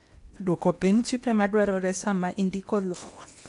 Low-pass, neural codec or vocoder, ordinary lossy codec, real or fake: 10.8 kHz; codec, 16 kHz in and 24 kHz out, 0.8 kbps, FocalCodec, streaming, 65536 codes; none; fake